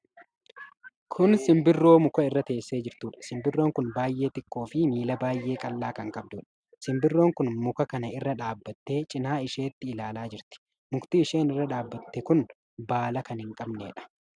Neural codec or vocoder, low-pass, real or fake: none; 9.9 kHz; real